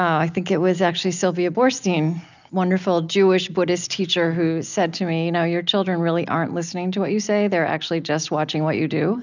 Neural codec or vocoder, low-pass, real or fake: none; 7.2 kHz; real